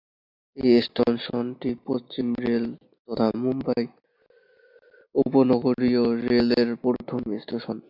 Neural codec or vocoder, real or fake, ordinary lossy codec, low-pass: none; real; MP3, 48 kbps; 5.4 kHz